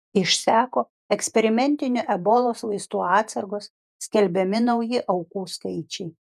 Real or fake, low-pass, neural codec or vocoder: fake; 14.4 kHz; vocoder, 48 kHz, 128 mel bands, Vocos